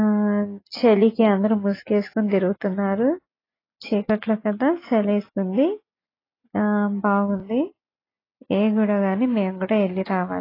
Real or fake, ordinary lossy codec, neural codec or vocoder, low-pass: real; AAC, 24 kbps; none; 5.4 kHz